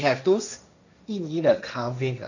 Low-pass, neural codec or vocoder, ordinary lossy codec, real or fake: 7.2 kHz; codec, 16 kHz, 1.1 kbps, Voila-Tokenizer; none; fake